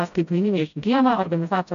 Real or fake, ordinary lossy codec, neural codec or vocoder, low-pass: fake; AAC, 96 kbps; codec, 16 kHz, 0.5 kbps, FreqCodec, smaller model; 7.2 kHz